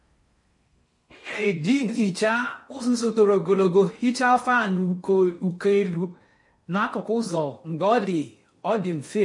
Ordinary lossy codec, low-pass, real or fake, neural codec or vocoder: MP3, 48 kbps; 10.8 kHz; fake; codec, 16 kHz in and 24 kHz out, 0.6 kbps, FocalCodec, streaming, 4096 codes